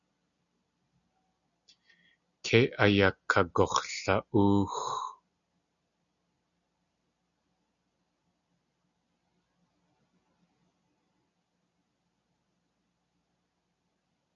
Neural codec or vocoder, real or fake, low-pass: none; real; 7.2 kHz